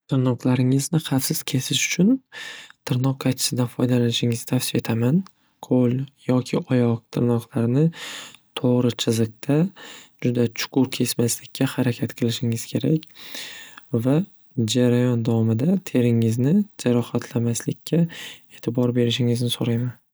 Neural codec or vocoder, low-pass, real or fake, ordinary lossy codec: none; none; real; none